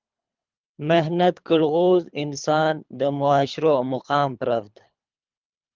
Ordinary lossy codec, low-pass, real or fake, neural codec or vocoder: Opus, 32 kbps; 7.2 kHz; fake; codec, 24 kHz, 3 kbps, HILCodec